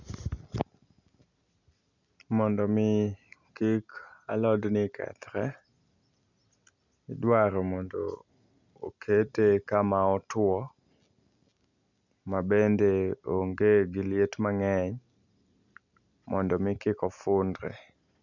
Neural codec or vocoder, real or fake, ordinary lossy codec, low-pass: none; real; none; 7.2 kHz